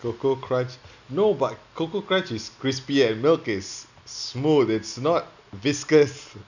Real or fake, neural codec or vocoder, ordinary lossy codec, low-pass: real; none; none; 7.2 kHz